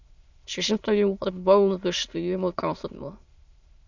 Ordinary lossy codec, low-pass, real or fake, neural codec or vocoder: Opus, 64 kbps; 7.2 kHz; fake; autoencoder, 22.05 kHz, a latent of 192 numbers a frame, VITS, trained on many speakers